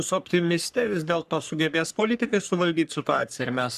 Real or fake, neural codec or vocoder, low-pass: fake; codec, 44.1 kHz, 3.4 kbps, Pupu-Codec; 14.4 kHz